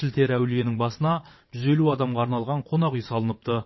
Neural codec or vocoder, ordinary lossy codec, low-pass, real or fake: vocoder, 22.05 kHz, 80 mel bands, WaveNeXt; MP3, 24 kbps; 7.2 kHz; fake